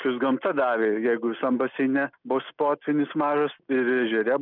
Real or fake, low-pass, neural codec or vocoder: real; 5.4 kHz; none